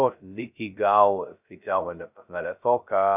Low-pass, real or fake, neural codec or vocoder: 3.6 kHz; fake; codec, 16 kHz, 0.2 kbps, FocalCodec